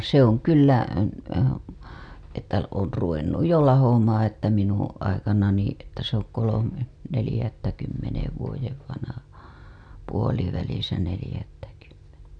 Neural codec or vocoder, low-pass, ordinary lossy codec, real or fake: none; 9.9 kHz; none; real